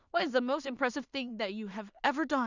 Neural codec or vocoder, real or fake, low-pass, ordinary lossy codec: codec, 16 kHz in and 24 kHz out, 0.4 kbps, LongCat-Audio-Codec, two codebook decoder; fake; 7.2 kHz; none